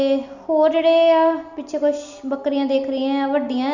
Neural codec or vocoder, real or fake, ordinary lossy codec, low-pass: none; real; none; 7.2 kHz